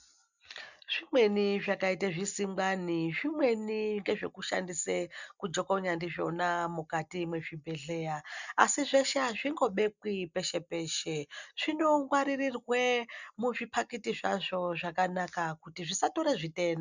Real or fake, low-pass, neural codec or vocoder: real; 7.2 kHz; none